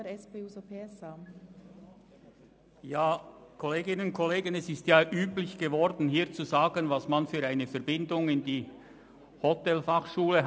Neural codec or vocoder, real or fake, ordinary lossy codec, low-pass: none; real; none; none